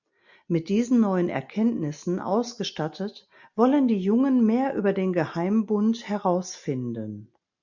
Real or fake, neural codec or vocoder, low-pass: real; none; 7.2 kHz